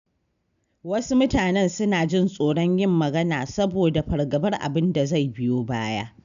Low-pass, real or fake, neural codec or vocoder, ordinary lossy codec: 7.2 kHz; real; none; none